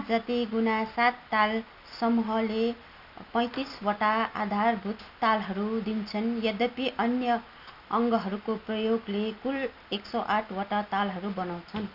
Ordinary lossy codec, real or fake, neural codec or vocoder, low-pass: none; real; none; 5.4 kHz